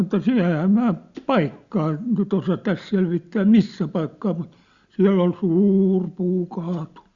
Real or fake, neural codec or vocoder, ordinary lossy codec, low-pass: real; none; Opus, 64 kbps; 7.2 kHz